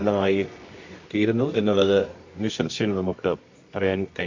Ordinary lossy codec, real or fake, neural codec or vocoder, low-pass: MP3, 48 kbps; fake; codec, 24 kHz, 0.9 kbps, WavTokenizer, medium music audio release; 7.2 kHz